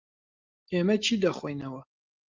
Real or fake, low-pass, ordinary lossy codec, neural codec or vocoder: real; 7.2 kHz; Opus, 32 kbps; none